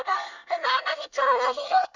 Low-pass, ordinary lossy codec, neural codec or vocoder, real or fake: 7.2 kHz; none; codec, 24 kHz, 1 kbps, SNAC; fake